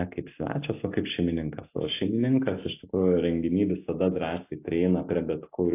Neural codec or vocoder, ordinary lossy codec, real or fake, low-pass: none; AAC, 24 kbps; real; 3.6 kHz